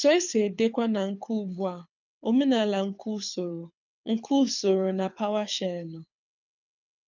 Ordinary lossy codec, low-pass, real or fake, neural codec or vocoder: none; 7.2 kHz; fake; codec, 24 kHz, 6 kbps, HILCodec